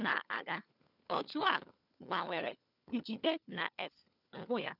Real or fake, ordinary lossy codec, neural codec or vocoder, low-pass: fake; none; codec, 16 kHz, 4 kbps, FunCodec, trained on LibriTTS, 50 frames a second; 5.4 kHz